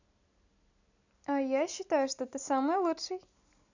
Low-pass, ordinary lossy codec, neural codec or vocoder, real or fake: 7.2 kHz; none; none; real